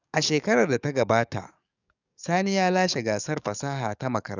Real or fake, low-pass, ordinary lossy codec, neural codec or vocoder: fake; 7.2 kHz; none; codec, 44.1 kHz, 7.8 kbps, DAC